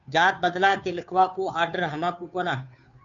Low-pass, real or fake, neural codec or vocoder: 7.2 kHz; fake; codec, 16 kHz, 2 kbps, FunCodec, trained on Chinese and English, 25 frames a second